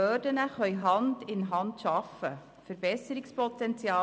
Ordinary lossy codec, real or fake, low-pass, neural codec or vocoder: none; real; none; none